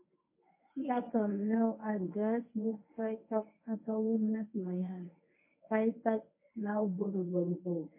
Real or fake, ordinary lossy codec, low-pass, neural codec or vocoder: fake; MP3, 24 kbps; 3.6 kHz; codec, 24 kHz, 0.9 kbps, WavTokenizer, medium speech release version 1